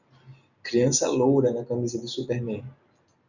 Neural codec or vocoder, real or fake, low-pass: none; real; 7.2 kHz